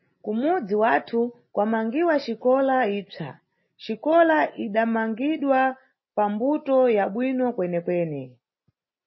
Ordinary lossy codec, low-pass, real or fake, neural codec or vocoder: MP3, 24 kbps; 7.2 kHz; real; none